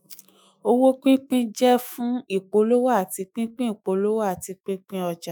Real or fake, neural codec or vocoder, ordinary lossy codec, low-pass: fake; autoencoder, 48 kHz, 128 numbers a frame, DAC-VAE, trained on Japanese speech; none; none